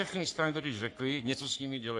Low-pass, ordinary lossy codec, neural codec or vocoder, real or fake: 10.8 kHz; AAC, 64 kbps; codec, 44.1 kHz, 3.4 kbps, Pupu-Codec; fake